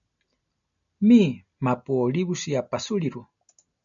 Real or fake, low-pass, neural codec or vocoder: real; 7.2 kHz; none